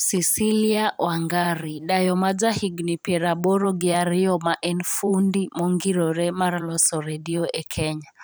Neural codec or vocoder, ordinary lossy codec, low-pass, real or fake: vocoder, 44.1 kHz, 128 mel bands every 512 samples, BigVGAN v2; none; none; fake